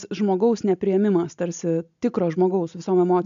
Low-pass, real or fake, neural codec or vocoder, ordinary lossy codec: 7.2 kHz; real; none; AAC, 96 kbps